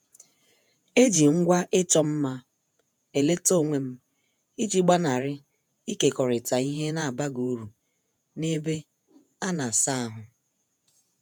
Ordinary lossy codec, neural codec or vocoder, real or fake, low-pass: none; vocoder, 48 kHz, 128 mel bands, Vocos; fake; none